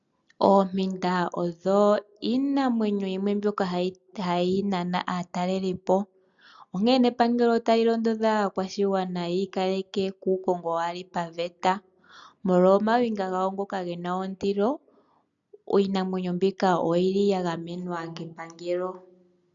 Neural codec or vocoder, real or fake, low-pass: none; real; 7.2 kHz